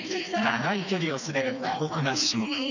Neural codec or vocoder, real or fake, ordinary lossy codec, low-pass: codec, 16 kHz, 2 kbps, FreqCodec, smaller model; fake; none; 7.2 kHz